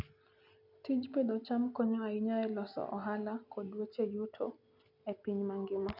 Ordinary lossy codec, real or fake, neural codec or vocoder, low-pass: none; real; none; 5.4 kHz